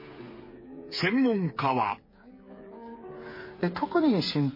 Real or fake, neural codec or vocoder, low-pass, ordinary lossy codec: fake; codec, 16 kHz, 8 kbps, FreqCodec, smaller model; 5.4 kHz; MP3, 24 kbps